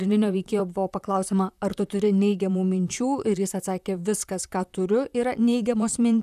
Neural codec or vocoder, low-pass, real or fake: vocoder, 44.1 kHz, 128 mel bands, Pupu-Vocoder; 14.4 kHz; fake